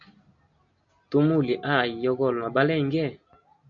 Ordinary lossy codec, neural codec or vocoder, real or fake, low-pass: AAC, 64 kbps; none; real; 7.2 kHz